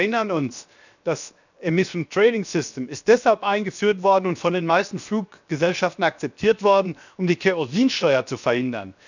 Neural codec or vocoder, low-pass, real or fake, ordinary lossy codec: codec, 16 kHz, 0.7 kbps, FocalCodec; 7.2 kHz; fake; none